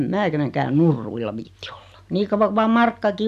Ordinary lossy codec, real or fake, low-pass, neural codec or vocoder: none; real; 14.4 kHz; none